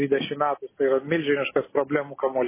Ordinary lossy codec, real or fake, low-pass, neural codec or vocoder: MP3, 16 kbps; real; 3.6 kHz; none